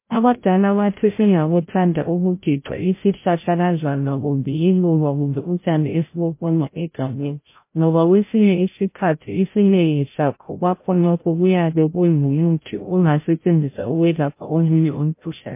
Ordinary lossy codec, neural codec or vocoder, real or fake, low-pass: MP3, 24 kbps; codec, 16 kHz, 0.5 kbps, FreqCodec, larger model; fake; 3.6 kHz